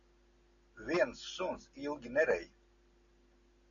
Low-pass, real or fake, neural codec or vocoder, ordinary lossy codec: 7.2 kHz; real; none; MP3, 96 kbps